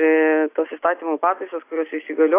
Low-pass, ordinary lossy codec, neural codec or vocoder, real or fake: 3.6 kHz; AAC, 24 kbps; none; real